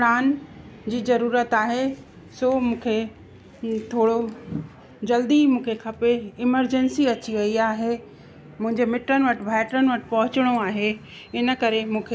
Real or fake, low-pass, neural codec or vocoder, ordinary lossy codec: real; none; none; none